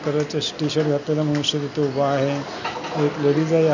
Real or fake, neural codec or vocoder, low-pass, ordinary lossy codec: real; none; 7.2 kHz; none